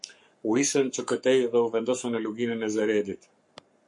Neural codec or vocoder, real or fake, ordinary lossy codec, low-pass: codec, 44.1 kHz, 7.8 kbps, Pupu-Codec; fake; MP3, 48 kbps; 10.8 kHz